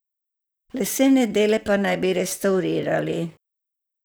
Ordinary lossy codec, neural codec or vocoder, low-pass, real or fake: none; vocoder, 44.1 kHz, 128 mel bands, Pupu-Vocoder; none; fake